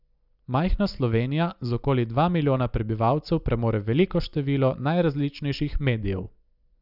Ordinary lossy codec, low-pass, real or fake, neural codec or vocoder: none; 5.4 kHz; real; none